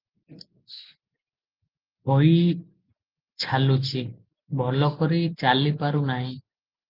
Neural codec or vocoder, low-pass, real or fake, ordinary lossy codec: none; 5.4 kHz; real; Opus, 24 kbps